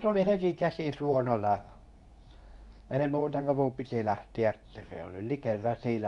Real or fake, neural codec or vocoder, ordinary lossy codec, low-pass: fake; codec, 24 kHz, 0.9 kbps, WavTokenizer, medium speech release version 2; none; 10.8 kHz